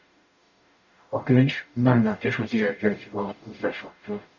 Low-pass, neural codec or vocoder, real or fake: 7.2 kHz; codec, 44.1 kHz, 0.9 kbps, DAC; fake